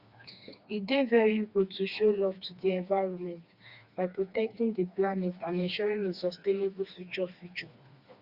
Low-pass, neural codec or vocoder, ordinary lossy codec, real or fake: 5.4 kHz; codec, 16 kHz, 2 kbps, FreqCodec, smaller model; Opus, 64 kbps; fake